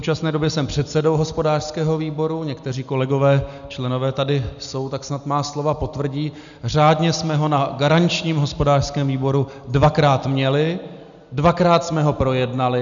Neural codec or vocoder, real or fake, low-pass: none; real; 7.2 kHz